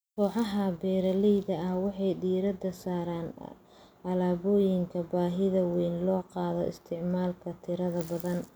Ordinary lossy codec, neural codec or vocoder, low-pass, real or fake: none; none; none; real